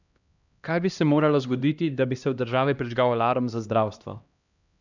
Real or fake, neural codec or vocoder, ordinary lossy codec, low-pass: fake; codec, 16 kHz, 1 kbps, X-Codec, HuBERT features, trained on LibriSpeech; none; 7.2 kHz